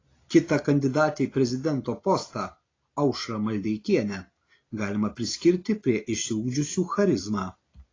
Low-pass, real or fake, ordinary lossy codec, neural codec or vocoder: 7.2 kHz; real; AAC, 32 kbps; none